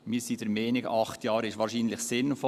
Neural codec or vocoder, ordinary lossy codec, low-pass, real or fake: none; Opus, 64 kbps; 14.4 kHz; real